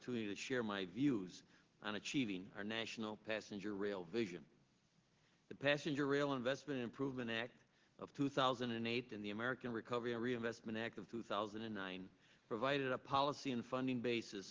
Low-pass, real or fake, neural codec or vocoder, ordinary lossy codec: 7.2 kHz; real; none; Opus, 16 kbps